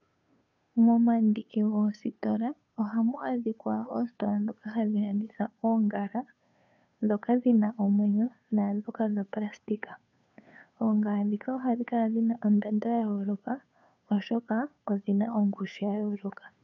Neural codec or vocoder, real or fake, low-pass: codec, 16 kHz, 2 kbps, FunCodec, trained on Chinese and English, 25 frames a second; fake; 7.2 kHz